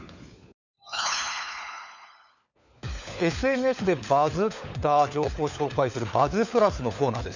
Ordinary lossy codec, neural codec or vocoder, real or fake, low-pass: none; codec, 16 kHz, 4 kbps, FunCodec, trained on LibriTTS, 50 frames a second; fake; 7.2 kHz